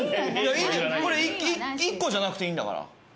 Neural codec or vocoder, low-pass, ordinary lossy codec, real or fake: none; none; none; real